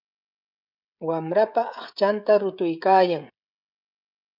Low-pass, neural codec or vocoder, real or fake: 5.4 kHz; codec, 16 kHz, 16 kbps, FreqCodec, smaller model; fake